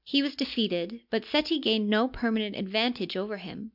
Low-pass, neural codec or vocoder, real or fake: 5.4 kHz; none; real